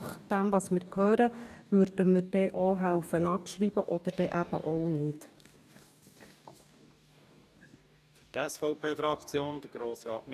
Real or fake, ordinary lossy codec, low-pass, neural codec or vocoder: fake; none; 14.4 kHz; codec, 44.1 kHz, 2.6 kbps, DAC